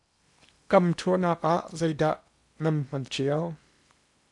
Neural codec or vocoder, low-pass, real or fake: codec, 16 kHz in and 24 kHz out, 0.8 kbps, FocalCodec, streaming, 65536 codes; 10.8 kHz; fake